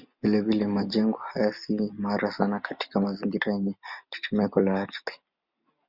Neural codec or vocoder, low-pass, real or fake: none; 5.4 kHz; real